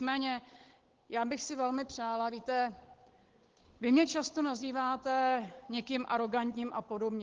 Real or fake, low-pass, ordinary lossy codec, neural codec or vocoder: fake; 7.2 kHz; Opus, 16 kbps; codec, 16 kHz, 8 kbps, FunCodec, trained on Chinese and English, 25 frames a second